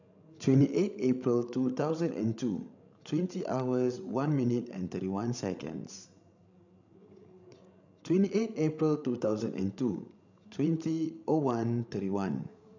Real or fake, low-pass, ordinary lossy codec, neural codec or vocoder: fake; 7.2 kHz; none; codec, 16 kHz, 16 kbps, FreqCodec, larger model